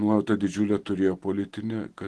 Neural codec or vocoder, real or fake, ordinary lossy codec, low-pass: none; real; Opus, 16 kbps; 10.8 kHz